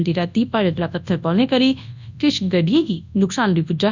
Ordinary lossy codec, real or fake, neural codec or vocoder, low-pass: none; fake; codec, 24 kHz, 0.9 kbps, WavTokenizer, large speech release; 7.2 kHz